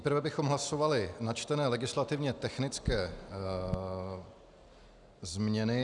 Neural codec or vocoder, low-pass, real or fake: none; 10.8 kHz; real